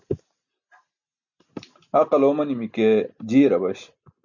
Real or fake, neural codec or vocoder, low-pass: real; none; 7.2 kHz